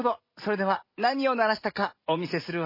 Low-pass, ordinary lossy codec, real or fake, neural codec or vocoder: 5.4 kHz; MP3, 24 kbps; real; none